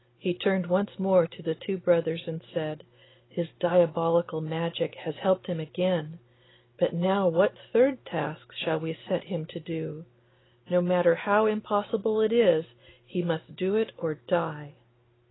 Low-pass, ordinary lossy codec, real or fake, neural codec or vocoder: 7.2 kHz; AAC, 16 kbps; real; none